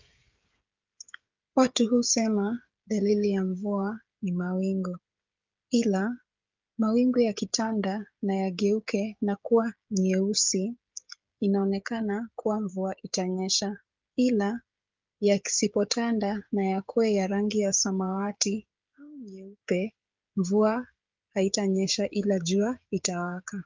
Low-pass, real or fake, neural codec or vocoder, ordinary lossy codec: 7.2 kHz; fake; codec, 16 kHz, 16 kbps, FreqCodec, smaller model; Opus, 24 kbps